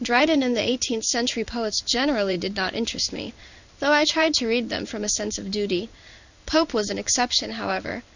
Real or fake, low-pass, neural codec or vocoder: fake; 7.2 kHz; codec, 16 kHz in and 24 kHz out, 1 kbps, XY-Tokenizer